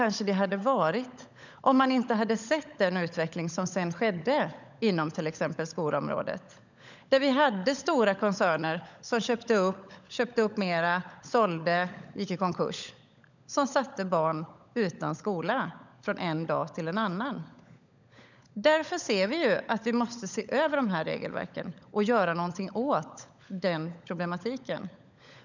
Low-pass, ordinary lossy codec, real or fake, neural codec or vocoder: 7.2 kHz; none; fake; codec, 16 kHz, 16 kbps, FunCodec, trained on LibriTTS, 50 frames a second